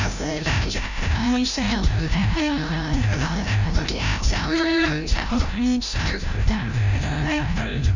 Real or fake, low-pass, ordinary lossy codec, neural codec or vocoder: fake; 7.2 kHz; none; codec, 16 kHz, 0.5 kbps, FreqCodec, larger model